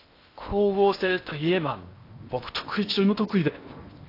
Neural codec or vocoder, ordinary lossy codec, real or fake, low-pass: codec, 16 kHz in and 24 kHz out, 0.8 kbps, FocalCodec, streaming, 65536 codes; AAC, 32 kbps; fake; 5.4 kHz